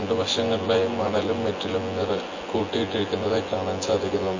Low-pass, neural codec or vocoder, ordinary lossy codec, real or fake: 7.2 kHz; vocoder, 24 kHz, 100 mel bands, Vocos; MP3, 32 kbps; fake